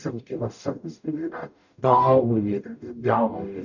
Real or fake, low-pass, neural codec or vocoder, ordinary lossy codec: fake; 7.2 kHz; codec, 44.1 kHz, 0.9 kbps, DAC; none